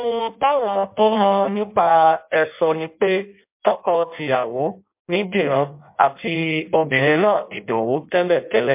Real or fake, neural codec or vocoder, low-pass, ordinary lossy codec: fake; codec, 16 kHz in and 24 kHz out, 0.6 kbps, FireRedTTS-2 codec; 3.6 kHz; MP3, 32 kbps